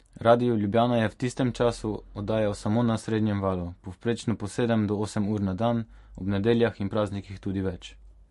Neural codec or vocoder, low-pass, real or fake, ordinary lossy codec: none; 14.4 kHz; real; MP3, 48 kbps